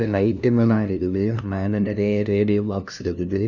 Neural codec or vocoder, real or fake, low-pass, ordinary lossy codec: codec, 16 kHz, 0.5 kbps, FunCodec, trained on LibriTTS, 25 frames a second; fake; 7.2 kHz; none